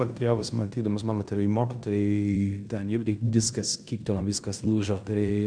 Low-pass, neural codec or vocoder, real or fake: 9.9 kHz; codec, 16 kHz in and 24 kHz out, 0.9 kbps, LongCat-Audio-Codec, fine tuned four codebook decoder; fake